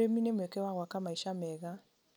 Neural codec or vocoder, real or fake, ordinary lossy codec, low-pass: none; real; none; none